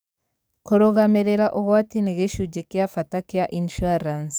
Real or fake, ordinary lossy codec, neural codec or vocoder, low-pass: fake; none; codec, 44.1 kHz, 7.8 kbps, DAC; none